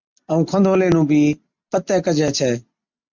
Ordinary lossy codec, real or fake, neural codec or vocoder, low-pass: MP3, 64 kbps; real; none; 7.2 kHz